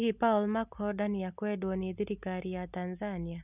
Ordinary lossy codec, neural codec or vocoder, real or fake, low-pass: none; none; real; 3.6 kHz